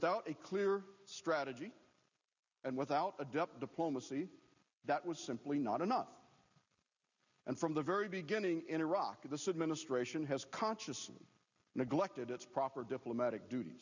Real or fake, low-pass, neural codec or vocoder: real; 7.2 kHz; none